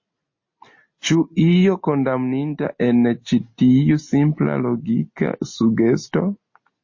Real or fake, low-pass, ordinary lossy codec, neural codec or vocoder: real; 7.2 kHz; MP3, 32 kbps; none